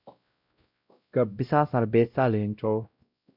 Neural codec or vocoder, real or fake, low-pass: codec, 16 kHz, 0.5 kbps, X-Codec, WavLM features, trained on Multilingual LibriSpeech; fake; 5.4 kHz